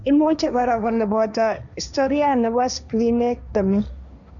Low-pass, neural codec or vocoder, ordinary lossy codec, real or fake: 7.2 kHz; codec, 16 kHz, 1.1 kbps, Voila-Tokenizer; none; fake